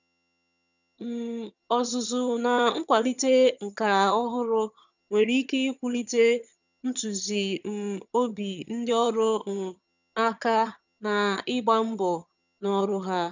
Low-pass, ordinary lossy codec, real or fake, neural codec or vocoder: 7.2 kHz; none; fake; vocoder, 22.05 kHz, 80 mel bands, HiFi-GAN